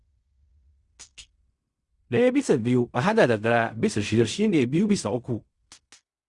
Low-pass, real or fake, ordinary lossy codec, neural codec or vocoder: 10.8 kHz; fake; Opus, 64 kbps; codec, 16 kHz in and 24 kHz out, 0.4 kbps, LongCat-Audio-Codec, fine tuned four codebook decoder